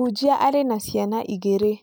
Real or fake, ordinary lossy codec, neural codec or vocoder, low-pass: real; none; none; none